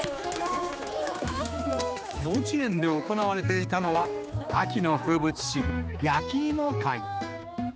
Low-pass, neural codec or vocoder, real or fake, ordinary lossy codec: none; codec, 16 kHz, 2 kbps, X-Codec, HuBERT features, trained on general audio; fake; none